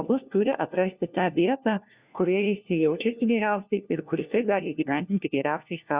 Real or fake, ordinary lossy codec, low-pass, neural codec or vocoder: fake; Opus, 64 kbps; 3.6 kHz; codec, 16 kHz, 1 kbps, FunCodec, trained on LibriTTS, 50 frames a second